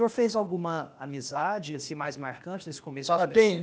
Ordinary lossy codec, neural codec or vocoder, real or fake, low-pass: none; codec, 16 kHz, 0.8 kbps, ZipCodec; fake; none